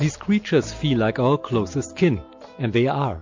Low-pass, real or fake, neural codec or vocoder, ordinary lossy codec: 7.2 kHz; real; none; MP3, 48 kbps